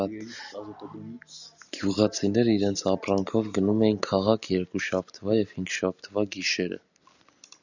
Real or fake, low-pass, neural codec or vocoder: real; 7.2 kHz; none